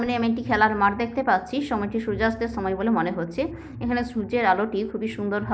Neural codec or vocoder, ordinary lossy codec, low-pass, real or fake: none; none; none; real